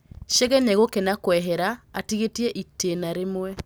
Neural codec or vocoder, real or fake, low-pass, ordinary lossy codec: none; real; none; none